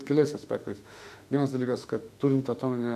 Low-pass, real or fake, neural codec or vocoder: 14.4 kHz; fake; autoencoder, 48 kHz, 32 numbers a frame, DAC-VAE, trained on Japanese speech